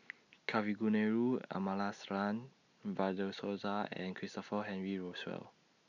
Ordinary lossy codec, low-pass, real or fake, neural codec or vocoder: none; 7.2 kHz; real; none